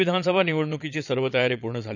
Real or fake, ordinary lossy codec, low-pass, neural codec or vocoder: fake; none; 7.2 kHz; vocoder, 22.05 kHz, 80 mel bands, Vocos